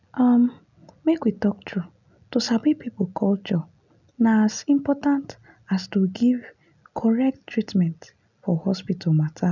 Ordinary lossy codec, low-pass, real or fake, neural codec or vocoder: none; 7.2 kHz; real; none